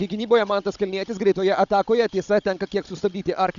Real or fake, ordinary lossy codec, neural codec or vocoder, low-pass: fake; Opus, 64 kbps; codec, 16 kHz, 16 kbps, FunCodec, trained on Chinese and English, 50 frames a second; 7.2 kHz